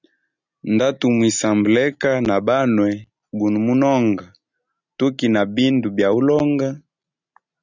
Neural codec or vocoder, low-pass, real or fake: none; 7.2 kHz; real